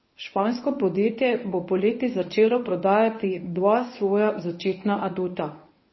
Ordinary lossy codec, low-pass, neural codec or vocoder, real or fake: MP3, 24 kbps; 7.2 kHz; codec, 24 kHz, 0.9 kbps, WavTokenizer, medium speech release version 1; fake